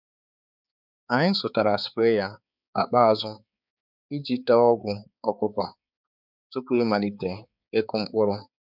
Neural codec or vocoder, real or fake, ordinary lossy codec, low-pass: codec, 16 kHz, 4 kbps, X-Codec, HuBERT features, trained on balanced general audio; fake; none; 5.4 kHz